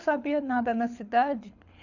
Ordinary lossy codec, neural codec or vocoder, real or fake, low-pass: none; codec, 24 kHz, 6 kbps, HILCodec; fake; 7.2 kHz